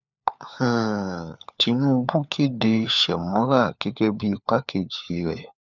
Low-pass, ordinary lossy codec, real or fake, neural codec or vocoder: 7.2 kHz; none; fake; codec, 16 kHz, 4 kbps, FunCodec, trained on LibriTTS, 50 frames a second